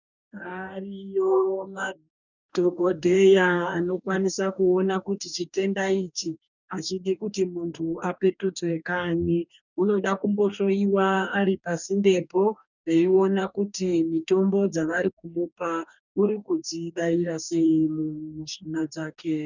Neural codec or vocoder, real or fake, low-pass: codec, 44.1 kHz, 2.6 kbps, DAC; fake; 7.2 kHz